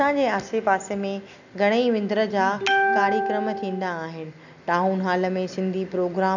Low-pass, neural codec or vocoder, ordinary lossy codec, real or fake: 7.2 kHz; none; none; real